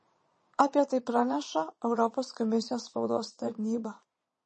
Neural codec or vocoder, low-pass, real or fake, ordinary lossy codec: vocoder, 22.05 kHz, 80 mel bands, Vocos; 9.9 kHz; fake; MP3, 32 kbps